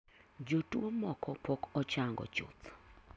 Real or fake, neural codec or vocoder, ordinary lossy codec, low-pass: real; none; none; none